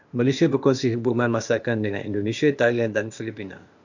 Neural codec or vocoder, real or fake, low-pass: codec, 16 kHz, 0.8 kbps, ZipCodec; fake; 7.2 kHz